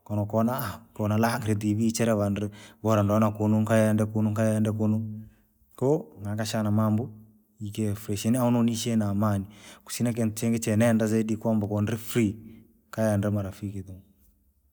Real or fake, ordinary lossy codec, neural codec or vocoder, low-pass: real; none; none; none